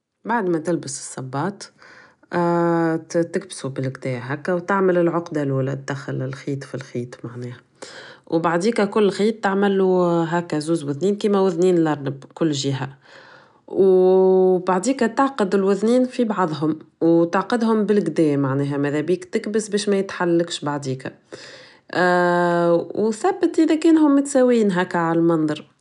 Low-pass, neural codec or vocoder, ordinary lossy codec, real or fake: 10.8 kHz; none; none; real